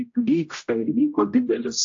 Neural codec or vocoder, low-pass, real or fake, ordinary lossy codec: codec, 16 kHz, 0.5 kbps, X-Codec, HuBERT features, trained on general audio; 7.2 kHz; fake; AAC, 48 kbps